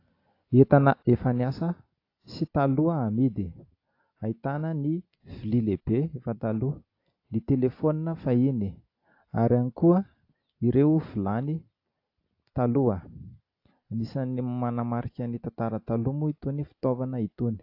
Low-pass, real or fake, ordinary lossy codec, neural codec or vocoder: 5.4 kHz; real; AAC, 32 kbps; none